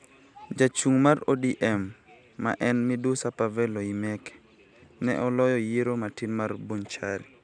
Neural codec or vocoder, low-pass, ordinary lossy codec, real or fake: none; 9.9 kHz; none; real